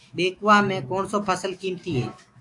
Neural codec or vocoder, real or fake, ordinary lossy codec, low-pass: autoencoder, 48 kHz, 128 numbers a frame, DAC-VAE, trained on Japanese speech; fake; AAC, 64 kbps; 10.8 kHz